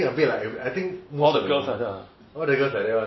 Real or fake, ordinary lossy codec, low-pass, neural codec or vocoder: real; MP3, 24 kbps; 7.2 kHz; none